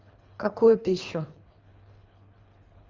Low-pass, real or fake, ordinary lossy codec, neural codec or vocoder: 7.2 kHz; fake; Opus, 32 kbps; codec, 24 kHz, 3 kbps, HILCodec